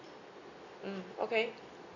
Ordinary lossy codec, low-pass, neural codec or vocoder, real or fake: none; 7.2 kHz; none; real